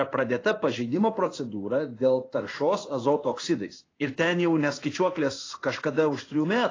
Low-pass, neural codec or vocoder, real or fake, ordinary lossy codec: 7.2 kHz; codec, 16 kHz in and 24 kHz out, 1 kbps, XY-Tokenizer; fake; AAC, 32 kbps